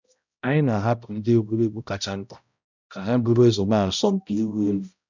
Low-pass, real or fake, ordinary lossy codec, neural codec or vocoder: 7.2 kHz; fake; none; codec, 16 kHz, 0.5 kbps, X-Codec, HuBERT features, trained on balanced general audio